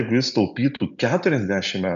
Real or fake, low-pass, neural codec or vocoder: real; 7.2 kHz; none